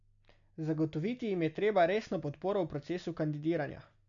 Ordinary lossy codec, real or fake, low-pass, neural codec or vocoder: none; real; 7.2 kHz; none